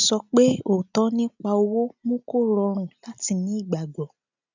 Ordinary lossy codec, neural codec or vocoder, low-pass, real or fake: none; none; 7.2 kHz; real